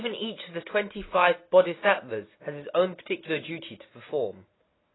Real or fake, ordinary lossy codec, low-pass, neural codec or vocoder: real; AAC, 16 kbps; 7.2 kHz; none